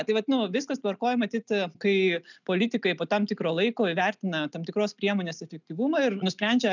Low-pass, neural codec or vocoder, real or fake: 7.2 kHz; none; real